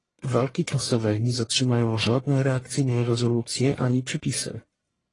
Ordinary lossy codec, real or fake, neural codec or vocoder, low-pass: AAC, 32 kbps; fake; codec, 44.1 kHz, 1.7 kbps, Pupu-Codec; 10.8 kHz